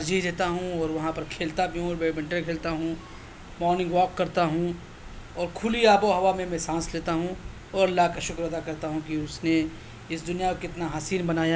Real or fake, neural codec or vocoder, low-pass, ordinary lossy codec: real; none; none; none